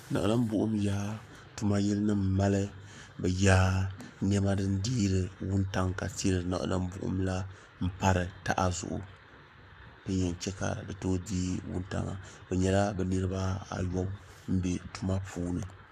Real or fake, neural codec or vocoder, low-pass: fake; codec, 44.1 kHz, 7.8 kbps, Pupu-Codec; 14.4 kHz